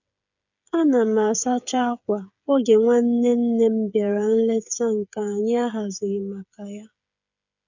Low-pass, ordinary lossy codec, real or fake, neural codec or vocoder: 7.2 kHz; none; fake; codec, 16 kHz, 8 kbps, FreqCodec, smaller model